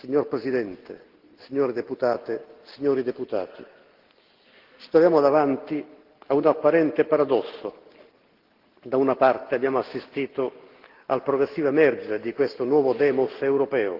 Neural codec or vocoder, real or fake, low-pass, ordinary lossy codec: none; real; 5.4 kHz; Opus, 16 kbps